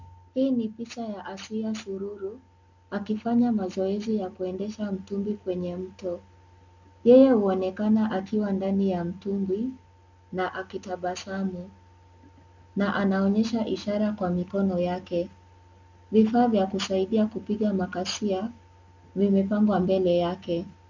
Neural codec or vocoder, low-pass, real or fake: none; 7.2 kHz; real